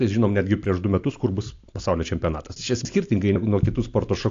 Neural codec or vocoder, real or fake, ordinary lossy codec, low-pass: none; real; AAC, 48 kbps; 7.2 kHz